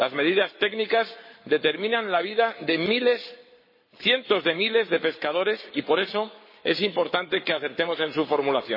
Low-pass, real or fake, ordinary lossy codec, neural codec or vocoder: 5.4 kHz; fake; MP3, 24 kbps; codec, 44.1 kHz, 7.8 kbps, Pupu-Codec